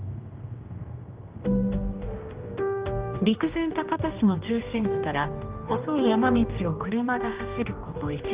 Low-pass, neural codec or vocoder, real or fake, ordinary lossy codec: 3.6 kHz; codec, 16 kHz, 1 kbps, X-Codec, HuBERT features, trained on general audio; fake; Opus, 32 kbps